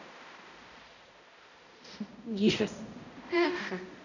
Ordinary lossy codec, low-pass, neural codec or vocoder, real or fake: none; 7.2 kHz; codec, 16 kHz, 0.5 kbps, X-Codec, HuBERT features, trained on balanced general audio; fake